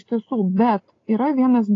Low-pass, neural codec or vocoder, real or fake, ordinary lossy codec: 7.2 kHz; none; real; AAC, 32 kbps